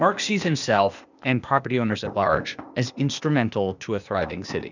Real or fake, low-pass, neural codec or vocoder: fake; 7.2 kHz; codec, 16 kHz, 0.8 kbps, ZipCodec